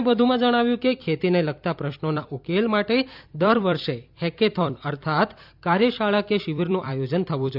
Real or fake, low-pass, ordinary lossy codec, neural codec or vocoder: fake; 5.4 kHz; none; vocoder, 44.1 kHz, 128 mel bands every 256 samples, BigVGAN v2